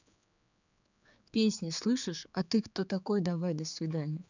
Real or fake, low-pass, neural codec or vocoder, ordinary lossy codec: fake; 7.2 kHz; codec, 16 kHz, 4 kbps, X-Codec, HuBERT features, trained on general audio; none